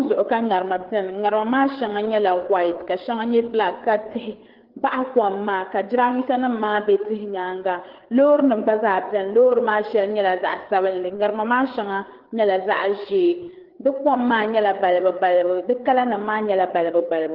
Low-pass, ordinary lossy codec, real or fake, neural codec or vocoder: 5.4 kHz; Opus, 16 kbps; fake; codec, 16 kHz, 4 kbps, FreqCodec, larger model